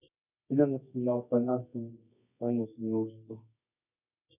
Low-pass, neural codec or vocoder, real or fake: 3.6 kHz; codec, 24 kHz, 0.9 kbps, WavTokenizer, medium music audio release; fake